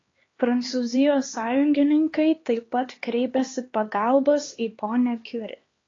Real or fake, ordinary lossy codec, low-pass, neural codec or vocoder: fake; AAC, 32 kbps; 7.2 kHz; codec, 16 kHz, 2 kbps, X-Codec, HuBERT features, trained on LibriSpeech